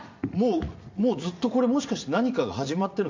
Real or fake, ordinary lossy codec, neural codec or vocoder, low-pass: fake; MP3, 48 kbps; vocoder, 44.1 kHz, 80 mel bands, Vocos; 7.2 kHz